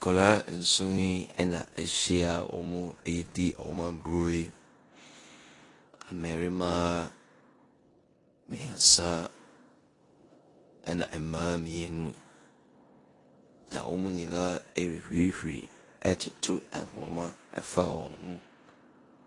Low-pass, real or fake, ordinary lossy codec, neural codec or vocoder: 10.8 kHz; fake; AAC, 32 kbps; codec, 16 kHz in and 24 kHz out, 0.9 kbps, LongCat-Audio-Codec, four codebook decoder